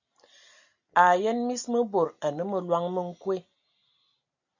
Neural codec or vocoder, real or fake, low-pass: none; real; 7.2 kHz